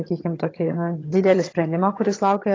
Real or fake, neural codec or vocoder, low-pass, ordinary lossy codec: fake; vocoder, 22.05 kHz, 80 mel bands, HiFi-GAN; 7.2 kHz; AAC, 32 kbps